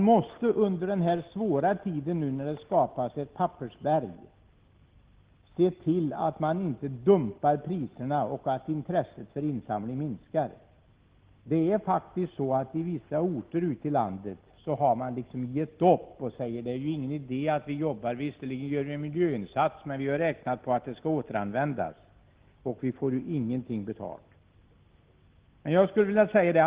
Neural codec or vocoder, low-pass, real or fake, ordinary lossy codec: none; 3.6 kHz; real; Opus, 16 kbps